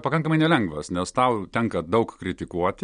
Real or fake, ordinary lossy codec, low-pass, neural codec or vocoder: real; MP3, 64 kbps; 9.9 kHz; none